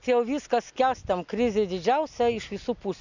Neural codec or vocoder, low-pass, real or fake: none; 7.2 kHz; real